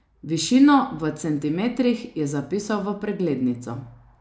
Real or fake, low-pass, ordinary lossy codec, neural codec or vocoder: real; none; none; none